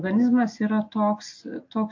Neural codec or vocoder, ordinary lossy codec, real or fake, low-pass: none; MP3, 48 kbps; real; 7.2 kHz